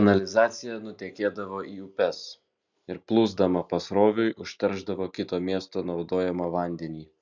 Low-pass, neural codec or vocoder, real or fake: 7.2 kHz; none; real